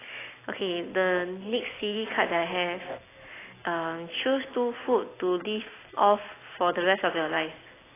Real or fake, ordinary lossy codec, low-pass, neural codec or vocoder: real; AAC, 16 kbps; 3.6 kHz; none